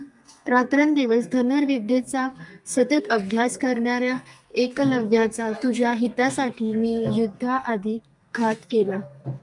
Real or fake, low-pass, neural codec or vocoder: fake; 10.8 kHz; codec, 32 kHz, 1.9 kbps, SNAC